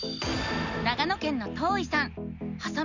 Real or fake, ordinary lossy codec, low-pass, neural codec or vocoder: real; none; 7.2 kHz; none